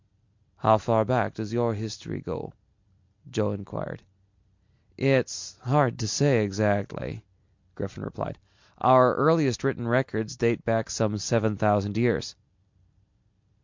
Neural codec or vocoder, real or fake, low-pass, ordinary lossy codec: none; real; 7.2 kHz; MP3, 64 kbps